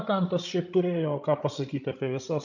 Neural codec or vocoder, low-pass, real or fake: codec, 16 kHz, 16 kbps, FreqCodec, larger model; 7.2 kHz; fake